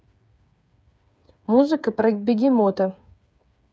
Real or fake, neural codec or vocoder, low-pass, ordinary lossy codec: fake; codec, 16 kHz, 8 kbps, FreqCodec, smaller model; none; none